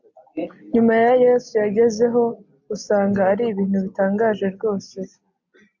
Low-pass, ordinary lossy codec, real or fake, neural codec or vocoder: 7.2 kHz; MP3, 64 kbps; real; none